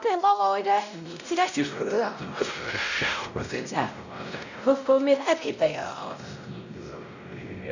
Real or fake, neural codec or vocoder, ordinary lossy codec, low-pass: fake; codec, 16 kHz, 0.5 kbps, X-Codec, WavLM features, trained on Multilingual LibriSpeech; none; 7.2 kHz